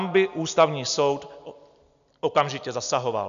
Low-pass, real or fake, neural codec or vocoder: 7.2 kHz; real; none